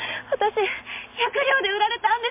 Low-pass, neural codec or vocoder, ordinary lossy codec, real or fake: 3.6 kHz; none; MP3, 32 kbps; real